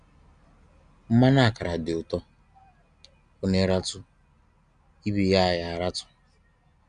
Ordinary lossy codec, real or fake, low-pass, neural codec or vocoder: none; real; 9.9 kHz; none